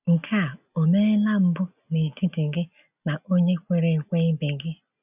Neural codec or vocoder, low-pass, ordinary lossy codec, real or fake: none; 3.6 kHz; none; real